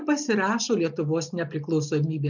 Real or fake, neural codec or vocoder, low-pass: real; none; 7.2 kHz